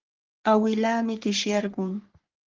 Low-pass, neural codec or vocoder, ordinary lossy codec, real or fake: 7.2 kHz; codec, 44.1 kHz, 3.4 kbps, Pupu-Codec; Opus, 16 kbps; fake